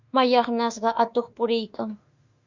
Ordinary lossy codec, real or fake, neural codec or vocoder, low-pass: Opus, 64 kbps; fake; autoencoder, 48 kHz, 32 numbers a frame, DAC-VAE, trained on Japanese speech; 7.2 kHz